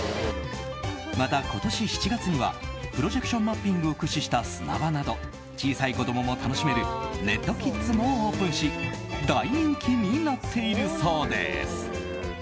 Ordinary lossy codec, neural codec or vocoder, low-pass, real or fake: none; none; none; real